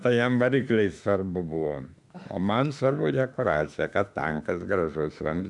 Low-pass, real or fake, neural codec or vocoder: 10.8 kHz; fake; autoencoder, 48 kHz, 32 numbers a frame, DAC-VAE, trained on Japanese speech